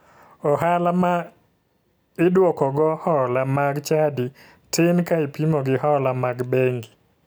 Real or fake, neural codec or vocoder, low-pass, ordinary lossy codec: real; none; none; none